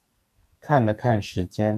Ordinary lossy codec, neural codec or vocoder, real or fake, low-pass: MP3, 96 kbps; codec, 44.1 kHz, 2.6 kbps, SNAC; fake; 14.4 kHz